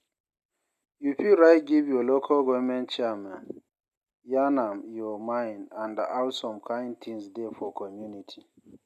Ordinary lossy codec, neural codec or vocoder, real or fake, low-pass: none; none; real; 14.4 kHz